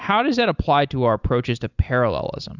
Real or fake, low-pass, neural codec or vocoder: real; 7.2 kHz; none